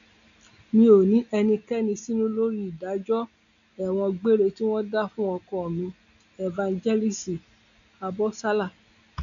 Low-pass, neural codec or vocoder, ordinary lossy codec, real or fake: 7.2 kHz; none; MP3, 96 kbps; real